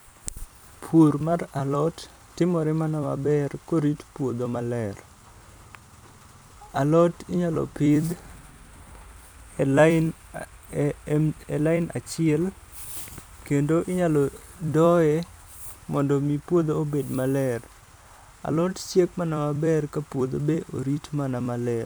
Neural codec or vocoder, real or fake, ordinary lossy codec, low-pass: vocoder, 44.1 kHz, 128 mel bands every 256 samples, BigVGAN v2; fake; none; none